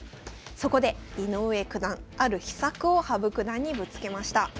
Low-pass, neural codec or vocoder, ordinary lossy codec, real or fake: none; none; none; real